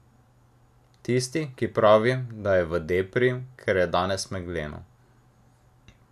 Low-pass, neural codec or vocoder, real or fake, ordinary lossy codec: 14.4 kHz; none; real; none